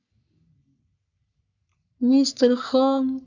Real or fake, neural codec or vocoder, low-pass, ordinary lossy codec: fake; codec, 44.1 kHz, 3.4 kbps, Pupu-Codec; 7.2 kHz; none